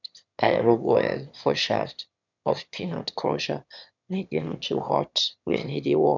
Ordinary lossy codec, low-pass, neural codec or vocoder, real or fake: none; 7.2 kHz; autoencoder, 22.05 kHz, a latent of 192 numbers a frame, VITS, trained on one speaker; fake